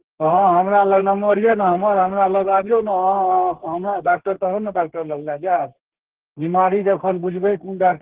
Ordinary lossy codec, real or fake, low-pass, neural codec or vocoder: Opus, 16 kbps; fake; 3.6 kHz; codec, 32 kHz, 1.9 kbps, SNAC